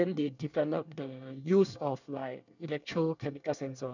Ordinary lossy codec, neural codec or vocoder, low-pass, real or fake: none; codec, 24 kHz, 1 kbps, SNAC; 7.2 kHz; fake